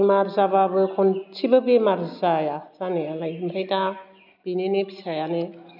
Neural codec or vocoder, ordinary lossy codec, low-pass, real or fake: none; none; 5.4 kHz; real